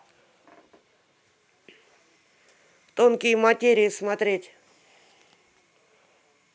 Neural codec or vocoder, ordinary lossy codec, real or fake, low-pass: none; none; real; none